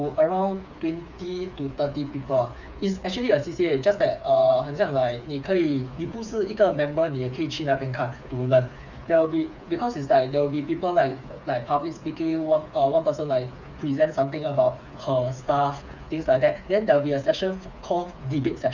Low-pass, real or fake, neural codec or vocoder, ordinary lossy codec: 7.2 kHz; fake; codec, 16 kHz, 4 kbps, FreqCodec, smaller model; none